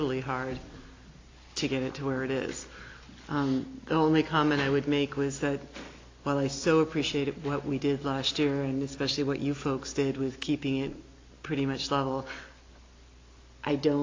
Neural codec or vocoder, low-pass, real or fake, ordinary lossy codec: none; 7.2 kHz; real; AAC, 32 kbps